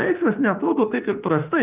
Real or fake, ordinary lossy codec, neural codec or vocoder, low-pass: fake; Opus, 24 kbps; autoencoder, 48 kHz, 32 numbers a frame, DAC-VAE, trained on Japanese speech; 3.6 kHz